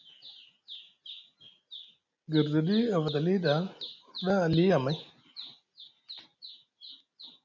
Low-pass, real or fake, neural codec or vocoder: 7.2 kHz; real; none